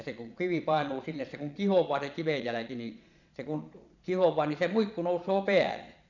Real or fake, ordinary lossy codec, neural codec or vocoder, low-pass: fake; none; vocoder, 22.05 kHz, 80 mel bands, Vocos; 7.2 kHz